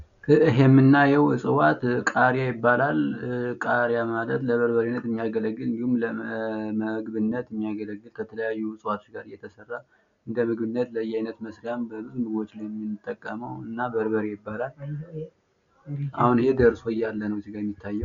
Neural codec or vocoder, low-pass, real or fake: none; 7.2 kHz; real